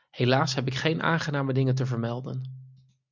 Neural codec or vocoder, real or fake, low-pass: none; real; 7.2 kHz